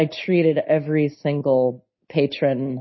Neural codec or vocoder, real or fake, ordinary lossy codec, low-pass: none; real; MP3, 24 kbps; 7.2 kHz